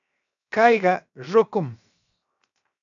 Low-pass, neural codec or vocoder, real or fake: 7.2 kHz; codec, 16 kHz, 0.7 kbps, FocalCodec; fake